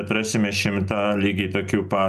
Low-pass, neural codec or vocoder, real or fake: 14.4 kHz; none; real